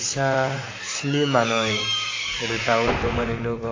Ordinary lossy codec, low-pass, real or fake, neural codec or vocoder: AAC, 32 kbps; 7.2 kHz; fake; vocoder, 44.1 kHz, 128 mel bands, Pupu-Vocoder